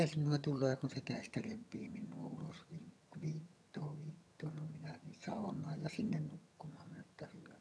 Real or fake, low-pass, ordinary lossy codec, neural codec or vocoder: fake; none; none; vocoder, 22.05 kHz, 80 mel bands, HiFi-GAN